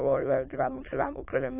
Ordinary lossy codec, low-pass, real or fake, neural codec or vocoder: AAC, 32 kbps; 3.6 kHz; fake; autoencoder, 22.05 kHz, a latent of 192 numbers a frame, VITS, trained on many speakers